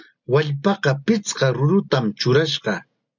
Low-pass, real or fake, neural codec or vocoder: 7.2 kHz; real; none